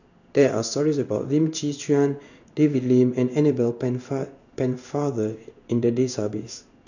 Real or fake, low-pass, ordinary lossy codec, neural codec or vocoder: fake; 7.2 kHz; none; codec, 16 kHz in and 24 kHz out, 1 kbps, XY-Tokenizer